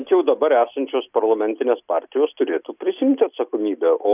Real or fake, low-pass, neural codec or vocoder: real; 3.6 kHz; none